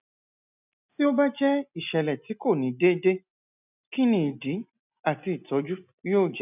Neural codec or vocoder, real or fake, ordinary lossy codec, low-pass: none; real; none; 3.6 kHz